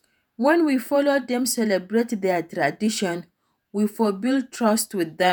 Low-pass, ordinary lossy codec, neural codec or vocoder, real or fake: none; none; vocoder, 48 kHz, 128 mel bands, Vocos; fake